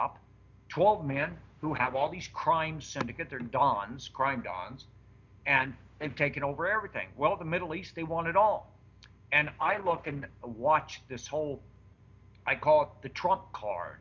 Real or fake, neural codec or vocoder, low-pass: real; none; 7.2 kHz